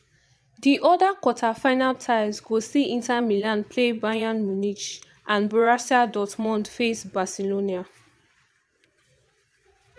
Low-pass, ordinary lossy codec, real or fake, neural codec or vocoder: none; none; fake; vocoder, 22.05 kHz, 80 mel bands, Vocos